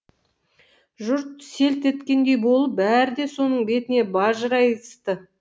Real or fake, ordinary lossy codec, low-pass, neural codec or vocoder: real; none; none; none